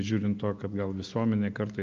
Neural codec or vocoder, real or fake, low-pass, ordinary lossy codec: none; real; 7.2 kHz; Opus, 32 kbps